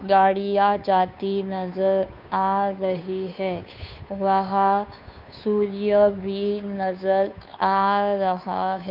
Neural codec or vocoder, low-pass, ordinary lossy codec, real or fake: codec, 16 kHz, 2 kbps, FunCodec, trained on Chinese and English, 25 frames a second; 5.4 kHz; none; fake